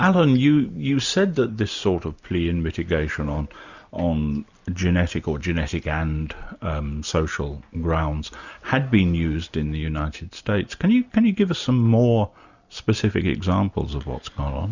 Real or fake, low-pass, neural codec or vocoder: real; 7.2 kHz; none